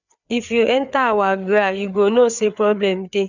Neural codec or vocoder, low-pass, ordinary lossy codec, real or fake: codec, 16 kHz, 4 kbps, FreqCodec, larger model; 7.2 kHz; none; fake